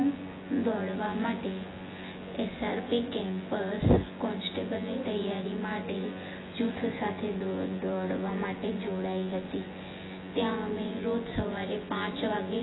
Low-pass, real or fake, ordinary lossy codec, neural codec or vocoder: 7.2 kHz; fake; AAC, 16 kbps; vocoder, 24 kHz, 100 mel bands, Vocos